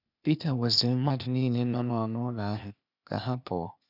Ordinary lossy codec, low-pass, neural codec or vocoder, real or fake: none; 5.4 kHz; codec, 16 kHz, 0.8 kbps, ZipCodec; fake